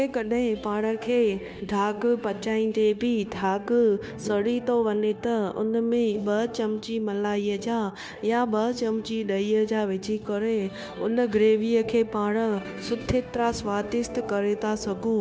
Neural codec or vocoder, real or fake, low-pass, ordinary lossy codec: codec, 16 kHz, 0.9 kbps, LongCat-Audio-Codec; fake; none; none